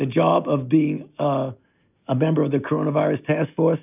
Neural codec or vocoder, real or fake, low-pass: none; real; 3.6 kHz